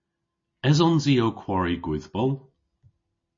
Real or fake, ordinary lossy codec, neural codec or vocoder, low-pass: real; MP3, 32 kbps; none; 7.2 kHz